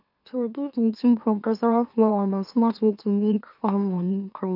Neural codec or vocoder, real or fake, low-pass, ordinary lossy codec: autoencoder, 44.1 kHz, a latent of 192 numbers a frame, MeloTTS; fake; 5.4 kHz; AAC, 48 kbps